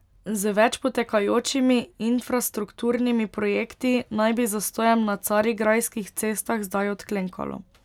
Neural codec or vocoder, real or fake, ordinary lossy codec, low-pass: none; real; none; 19.8 kHz